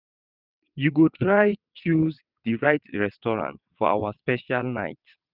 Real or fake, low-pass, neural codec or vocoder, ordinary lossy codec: fake; 5.4 kHz; vocoder, 22.05 kHz, 80 mel bands, WaveNeXt; none